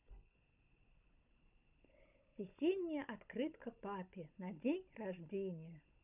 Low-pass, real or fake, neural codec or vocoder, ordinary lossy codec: 3.6 kHz; fake; codec, 16 kHz, 16 kbps, FunCodec, trained on Chinese and English, 50 frames a second; none